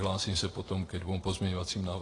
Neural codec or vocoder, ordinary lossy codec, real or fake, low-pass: vocoder, 44.1 kHz, 128 mel bands every 512 samples, BigVGAN v2; AAC, 32 kbps; fake; 10.8 kHz